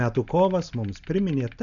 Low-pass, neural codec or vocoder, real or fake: 7.2 kHz; none; real